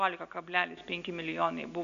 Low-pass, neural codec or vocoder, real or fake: 7.2 kHz; none; real